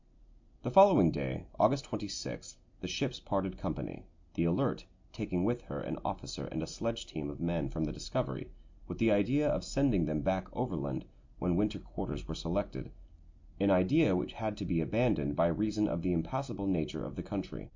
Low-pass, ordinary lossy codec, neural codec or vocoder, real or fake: 7.2 kHz; MP3, 48 kbps; none; real